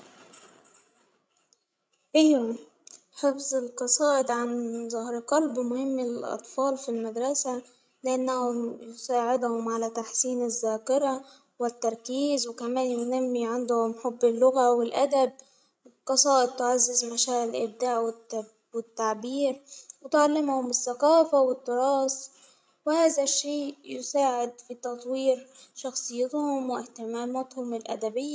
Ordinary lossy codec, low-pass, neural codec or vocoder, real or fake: none; none; codec, 16 kHz, 16 kbps, FreqCodec, larger model; fake